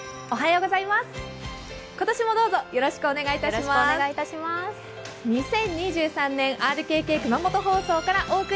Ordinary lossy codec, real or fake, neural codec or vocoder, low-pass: none; real; none; none